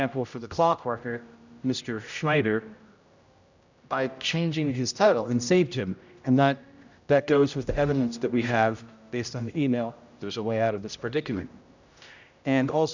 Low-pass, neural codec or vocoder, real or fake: 7.2 kHz; codec, 16 kHz, 0.5 kbps, X-Codec, HuBERT features, trained on general audio; fake